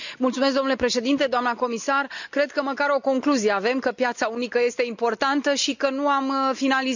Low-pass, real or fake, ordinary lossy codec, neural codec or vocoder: 7.2 kHz; real; none; none